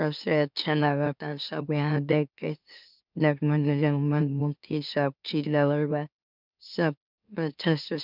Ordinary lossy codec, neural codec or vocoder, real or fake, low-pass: none; autoencoder, 44.1 kHz, a latent of 192 numbers a frame, MeloTTS; fake; 5.4 kHz